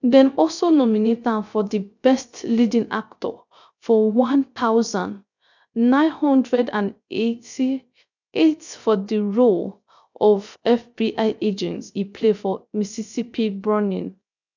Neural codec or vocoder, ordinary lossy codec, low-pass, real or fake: codec, 16 kHz, 0.3 kbps, FocalCodec; none; 7.2 kHz; fake